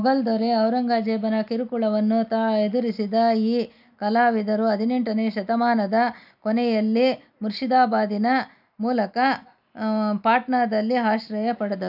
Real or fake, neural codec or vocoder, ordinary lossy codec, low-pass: real; none; none; 5.4 kHz